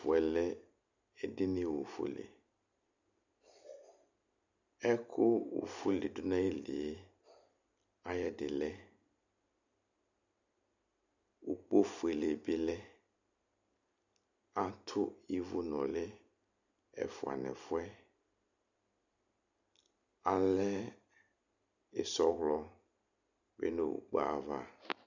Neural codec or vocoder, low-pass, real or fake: none; 7.2 kHz; real